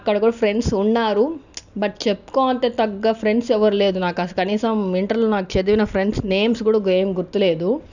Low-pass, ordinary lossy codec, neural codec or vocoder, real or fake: 7.2 kHz; none; none; real